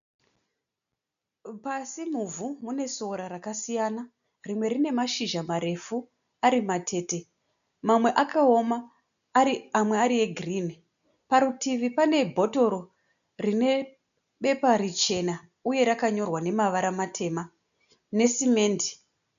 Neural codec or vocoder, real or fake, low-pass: none; real; 7.2 kHz